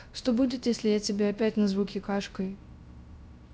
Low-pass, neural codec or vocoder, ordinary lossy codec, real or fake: none; codec, 16 kHz, 0.3 kbps, FocalCodec; none; fake